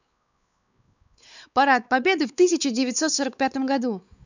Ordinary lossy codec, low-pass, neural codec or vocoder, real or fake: none; 7.2 kHz; codec, 16 kHz, 4 kbps, X-Codec, WavLM features, trained on Multilingual LibriSpeech; fake